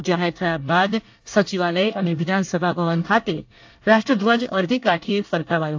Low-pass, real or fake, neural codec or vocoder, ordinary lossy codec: 7.2 kHz; fake; codec, 24 kHz, 1 kbps, SNAC; AAC, 48 kbps